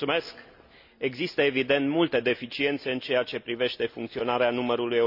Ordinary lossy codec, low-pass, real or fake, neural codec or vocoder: none; 5.4 kHz; real; none